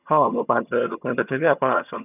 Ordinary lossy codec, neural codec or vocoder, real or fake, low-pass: none; vocoder, 22.05 kHz, 80 mel bands, HiFi-GAN; fake; 3.6 kHz